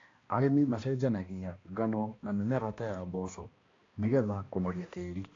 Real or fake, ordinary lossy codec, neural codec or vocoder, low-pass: fake; AAC, 32 kbps; codec, 16 kHz, 1 kbps, X-Codec, HuBERT features, trained on balanced general audio; 7.2 kHz